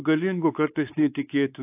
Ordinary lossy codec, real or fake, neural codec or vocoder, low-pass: AAC, 24 kbps; fake; codec, 16 kHz, 4 kbps, X-Codec, WavLM features, trained on Multilingual LibriSpeech; 3.6 kHz